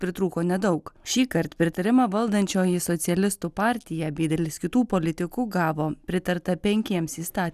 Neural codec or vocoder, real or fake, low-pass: none; real; 14.4 kHz